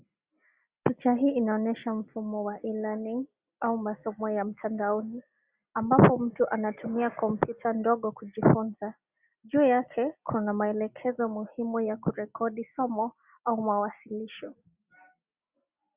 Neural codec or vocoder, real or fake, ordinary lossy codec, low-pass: none; real; Opus, 64 kbps; 3.6 kHz